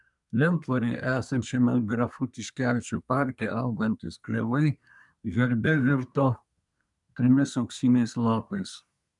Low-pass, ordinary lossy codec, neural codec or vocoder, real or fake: 10.8 kHz; MP3, 96 kbps; codec, 24 kHz, 1 kbps, SNAC; fake